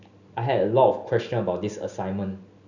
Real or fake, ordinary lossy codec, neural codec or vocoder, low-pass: real; none; none; 7.2 kHz